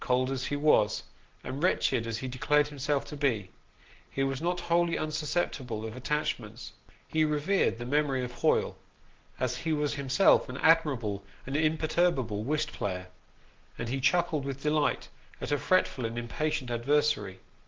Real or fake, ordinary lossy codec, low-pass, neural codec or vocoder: real; Opus, 16 kbps; 7.2 kHz; none